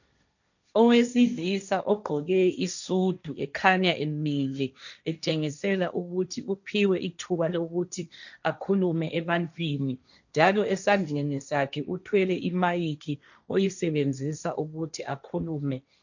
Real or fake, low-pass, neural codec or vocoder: fake; 7.2 kHz; codec, 16 kHz, 1.1 kbps, Voila-Tokenizer